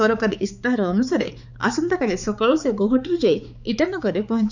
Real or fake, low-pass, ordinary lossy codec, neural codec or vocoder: fake; 7.2 kHz; none; codec, 16 kHz, 4 kbps, X-Codec, HuBERT features, trained on balanced general audio